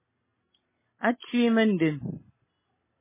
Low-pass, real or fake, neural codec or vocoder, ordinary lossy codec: 3.6 kHz; real; none; MP3, 16 kbps